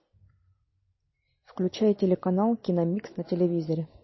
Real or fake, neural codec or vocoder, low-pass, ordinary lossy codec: real; none; 7.2 kHz; MP3, 24 kbps